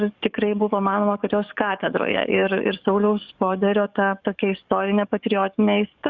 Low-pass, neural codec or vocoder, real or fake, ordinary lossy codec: 7.2 kHz; codec, 44.1 kHz, 7.8 kbps, DAC; fake; Opus, 64 kbps